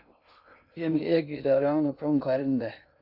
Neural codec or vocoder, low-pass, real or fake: codec, 16 kHz in and 24 kHz out, 0.6 kbps, FocalCodec, streaming, 2048 codes; 5.4 kHz; fake